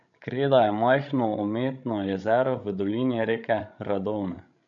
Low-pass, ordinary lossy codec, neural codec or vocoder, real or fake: 7.2 kHz; none; codec, 16 kHz, 16 kbps, FreqCodec, larger model; fake